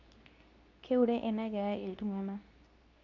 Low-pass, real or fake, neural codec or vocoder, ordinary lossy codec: 7.2 kHz; fake; codec, 24 kHz, 0.9 kbps, WavTokenizer, medium speech release version 2; none